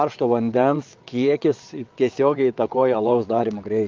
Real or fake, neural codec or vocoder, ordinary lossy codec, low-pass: fake; vocoder, 22.05 kHz, 80 mel bands, WaveNeXt; Opus, 24 kbps; 7.2 kHz